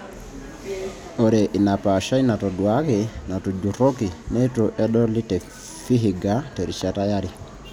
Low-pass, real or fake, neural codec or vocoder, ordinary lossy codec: 19.8 kHz; real; none; none